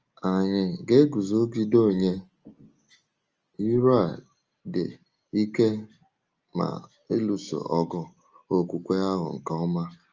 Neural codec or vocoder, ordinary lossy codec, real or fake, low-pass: none; Opus, 24 kbps; real; 7.2 kHz